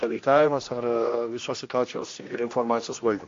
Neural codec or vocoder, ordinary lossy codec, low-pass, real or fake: codec, 16 kHz, 1 kbps, X-Codec, HuBERT features, trained on general audio; AAC, 48 kbps; 7.2 kHz; fake